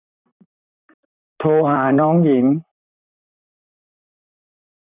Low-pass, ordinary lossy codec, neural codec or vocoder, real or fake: 3.6 kHz; none; vocoder, 44.1 kHz, 128 mel bands, Pupu-Vocoder; fake